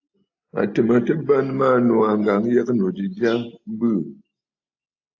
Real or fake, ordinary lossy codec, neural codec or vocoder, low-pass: real; AAC, 48 kbps; none; 7.2 kHz